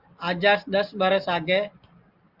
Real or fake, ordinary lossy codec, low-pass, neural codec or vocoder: real; Opus, 32 kbps; 5.4 kHz; none